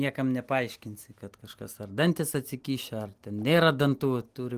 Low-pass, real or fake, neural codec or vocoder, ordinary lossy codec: 14.4 kHz; real; none; Opus, 24 kbps